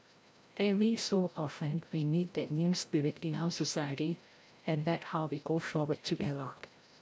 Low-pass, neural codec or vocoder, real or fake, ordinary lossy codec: none; codec, 16 kHz, 0.5 kbps, FreqCodec, larger model; fake; none